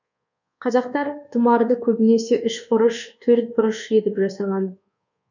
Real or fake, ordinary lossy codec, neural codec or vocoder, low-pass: fake; none; codec, 24 kHz, 1.2 kbps, DualCodec; 7.2 kHz